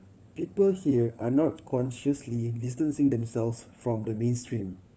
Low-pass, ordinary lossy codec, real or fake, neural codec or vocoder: none; none; fake; codec, 16 kHz, 4 kbps, FunCodec, trained on LibriTTS, 50 frames a second